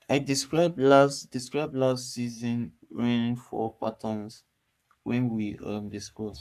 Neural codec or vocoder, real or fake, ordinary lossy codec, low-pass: codec, 44.1 kHz, 3.4 kbps, Pupu-Codec; fake; none; 14.4 kHz